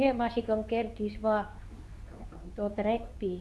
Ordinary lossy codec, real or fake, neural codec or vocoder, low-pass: none; fake; codec, 24 kHz, 0.9 kbps, WavTokenizer, medium speech release version 2; none